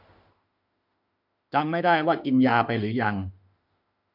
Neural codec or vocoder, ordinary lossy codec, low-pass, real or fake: autoencoder, 48 kHz, 32 numbers a frame, DAC-VAE, trained on Japanese speech; none; 5.4 kHz; fake